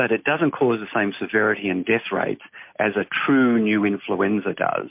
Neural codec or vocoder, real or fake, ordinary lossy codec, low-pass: none; real; MP3, 32 kbps; 3.6 kHz